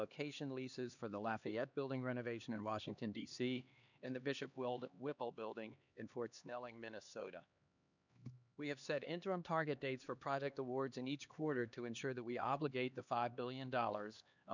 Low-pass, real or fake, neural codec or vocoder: 7.2 kHz; fake; codec, 16 kHz, 2 kbps, X-Codec, HuBERT features, trained on LibriSpeech